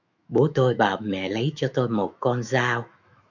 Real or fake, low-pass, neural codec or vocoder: fake; 7.2 kHz; autoencoder, 48 kHz, 128 numbers a frame, DAC-VAE, trained on Japanese speech